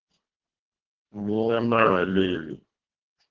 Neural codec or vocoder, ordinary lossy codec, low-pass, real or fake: codec, 24 kHz, 1.5 kbps, HILCodec; Opus, 16 kbps; 7.2 kHz; fake